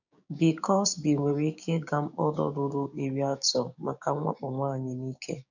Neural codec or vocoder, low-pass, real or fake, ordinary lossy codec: codec, 16 kHz, 6 kbps, DAC; 7.2 kHz; fake; none